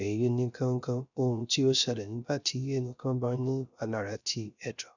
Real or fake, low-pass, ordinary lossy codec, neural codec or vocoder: fake; 7.2 kHz; none; codec, 16 kHz, 0.3 kbps, FocalCodec